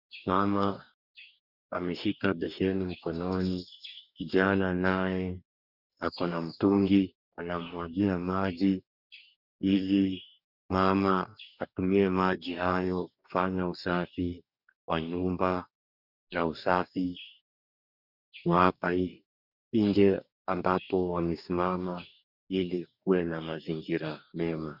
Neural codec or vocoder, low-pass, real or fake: codec, 44.1 kHz, 2.6 kbps, DAC; 5.4 kHz; fake